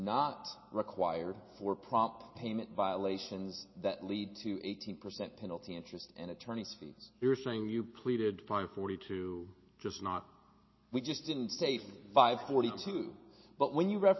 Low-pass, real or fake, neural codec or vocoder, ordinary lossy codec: 7.2 kHz; real; none; MP3, 24 kbps